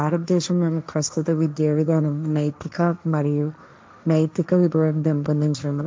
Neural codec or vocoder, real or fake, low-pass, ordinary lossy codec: codec, 16 kHz, 1.1 kbps, Voila-Tokenizer; fake; none; none